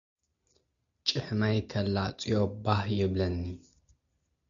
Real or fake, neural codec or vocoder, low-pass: real; none; 7.2 kHz